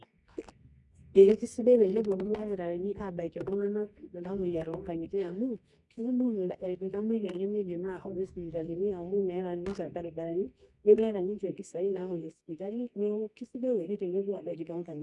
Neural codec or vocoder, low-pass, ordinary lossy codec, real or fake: codec, 24 kHz, 0.9 kbps, WavTokenizer, medium music audio release; 10.8 kHz; none; fake